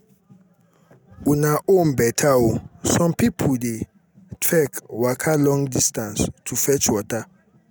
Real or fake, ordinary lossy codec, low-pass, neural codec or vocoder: real; none; none; none